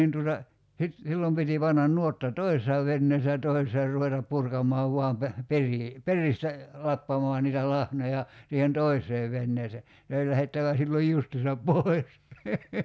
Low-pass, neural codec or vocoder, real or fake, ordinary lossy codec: none; none; real; none